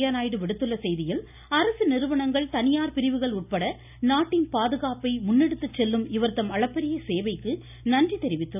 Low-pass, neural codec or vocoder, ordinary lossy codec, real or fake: 3.6 kHz; none; none; real